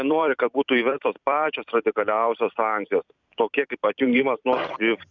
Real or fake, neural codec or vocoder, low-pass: fake; vocoder, 44.1 kHz, 128 mel bands every 256 samples, BigVGAN v2; 7.2 kHz